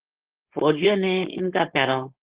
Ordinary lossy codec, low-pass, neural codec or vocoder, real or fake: Opus, 16 kbps; 3.6 kHz; codec, 16 kHz, 4.8 kbps, FACodec; fake